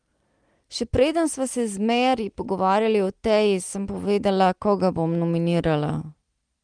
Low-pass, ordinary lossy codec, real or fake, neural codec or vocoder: 9.9 kHz; Opus, 24 kbps; real; none